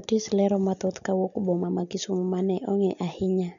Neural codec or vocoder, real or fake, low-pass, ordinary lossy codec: none; real; 7.2 kHz; MP3, 96 kbps